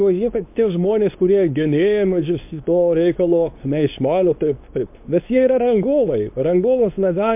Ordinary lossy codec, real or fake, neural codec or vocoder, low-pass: MP3, 32 kbps; fake; codec, 24 kHz, 0.9 kbps, WavTokenizer, medium speech release version 2; 3.6 kHz